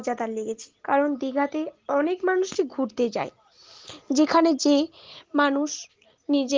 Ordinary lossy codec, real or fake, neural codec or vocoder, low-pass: Opus, 16 kbps; real; none; 7.2 kHz